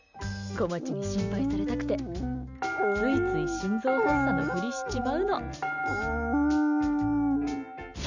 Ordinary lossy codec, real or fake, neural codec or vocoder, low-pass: none; real; none; 7.2 kHz